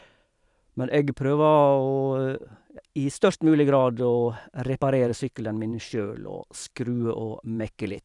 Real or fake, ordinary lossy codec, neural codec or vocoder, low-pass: fake; none; vocoder, 44.1 kHz, 128 mel bands every 512 samples, BigVGAN v2; 10.8 kHz